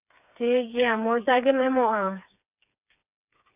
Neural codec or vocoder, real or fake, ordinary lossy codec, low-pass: codec, 16 kHz, 4 kbps, FreqCodec, smaller model; fake; none; 3.6 kHz